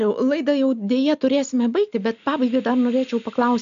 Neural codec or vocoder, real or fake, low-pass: none; real; 7.2 kHz